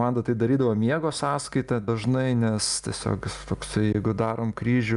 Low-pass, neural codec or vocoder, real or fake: 10.8 kHz; none; real